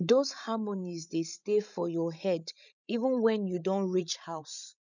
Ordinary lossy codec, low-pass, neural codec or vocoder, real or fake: none; 7.2 kHz; codec, 16 kHz, 16 kbps, FreqCodec, larger model; fake